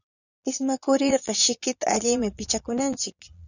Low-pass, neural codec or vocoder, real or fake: 7.2 kHz; vocoder, 22.05 kHz, 80 mel bands, Vocos; fake